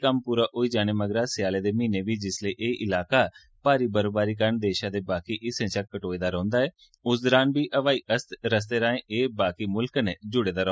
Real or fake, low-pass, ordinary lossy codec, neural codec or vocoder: real; none; none; none